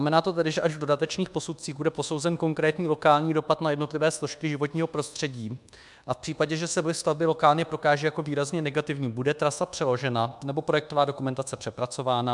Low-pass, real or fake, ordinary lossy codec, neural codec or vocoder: 10.8 kHz; fake; MP3, 96 kbps; codec, 24 kHz, 1.2 kbps, DualCodec